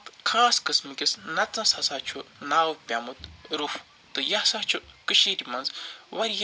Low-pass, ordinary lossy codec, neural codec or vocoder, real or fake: none; none; none; real